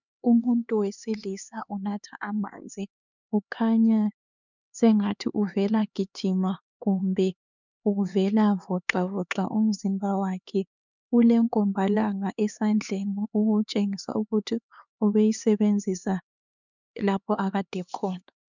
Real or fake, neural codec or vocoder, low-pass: fake; codec, 16 kHz, 4 kbps, X-Codec, HuBERT features, trained on LibriSpeech; 7.2 kHz